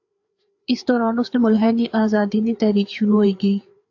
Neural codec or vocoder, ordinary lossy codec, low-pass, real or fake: codec, 16 kHz, 4 kbps, FreqCodec, larger model; AAC, 48 kbps; 7.2 kHz; fake